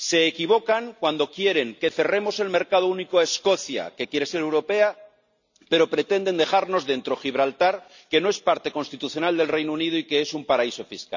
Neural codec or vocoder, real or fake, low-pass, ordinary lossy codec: none; real; 7.2 kHz; none